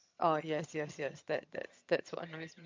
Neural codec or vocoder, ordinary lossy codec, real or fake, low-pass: vocoder, 22.05 kHz, 80 mel bands, HiFi-GAN; MP3, 48 kbps; fake; 7.2 kHz